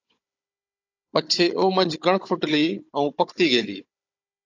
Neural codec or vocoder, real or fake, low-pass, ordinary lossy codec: codec, 16 kHz, 16 kbps, FunCodec, trained on Chinese and English, 50 frames a second; fake; 7.2 kHz; AAC, 48 kbps